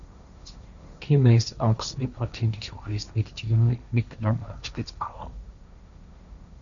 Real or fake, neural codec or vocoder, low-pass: fake; codec, 16 kHz, 1.1 kbps, Voila-Tokenizer; 7.2 kHz